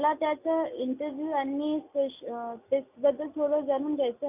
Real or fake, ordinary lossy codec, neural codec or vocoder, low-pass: real; none; none; 3.6 kHz